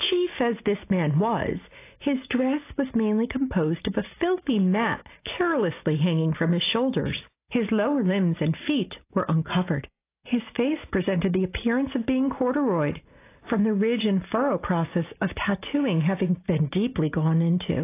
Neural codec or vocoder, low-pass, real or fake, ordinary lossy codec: none; 3.6 kHz; real; AAC, 24 kbps